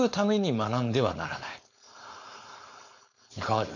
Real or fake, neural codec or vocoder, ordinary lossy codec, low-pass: fake; codec, 16 kHz, 4.8 kbps, FACodec; none; 7.2 kHz